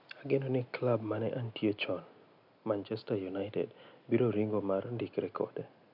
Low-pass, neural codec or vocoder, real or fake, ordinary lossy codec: 5.4 kHz; none; real; none